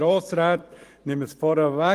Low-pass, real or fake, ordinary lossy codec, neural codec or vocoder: 14.4 kHz; fake; Opus, 24 kbps; vocoder, 44.1 kHz, 128 mel bands every 512 samples, BigVGAN v2